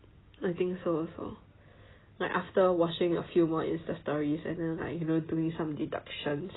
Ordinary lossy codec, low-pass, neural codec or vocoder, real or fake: AAC, 16 kbps; 7.2 kHz; none; real